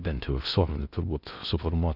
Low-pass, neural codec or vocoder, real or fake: 5.4 kHz; codec, 16 kHz in and 24 kHz out, 0.6 kbps, FocalCodec, streaming, 4096 codes; fake